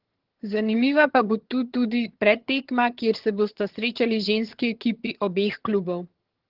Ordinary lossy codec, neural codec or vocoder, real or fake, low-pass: Opus, 16 kbps; vocoder, 22.05 kHz, 80 mel bands, HiFi-GAN; fake; 5.4 kHz